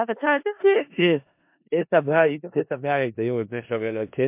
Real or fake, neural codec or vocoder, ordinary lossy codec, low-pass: fake; codec, 16 kHz in and 24 kHz out, 0.4 kbps, LongCat-Audio-Codec, four codebook decoder; MP3, 32 kbps; 3.6 kHz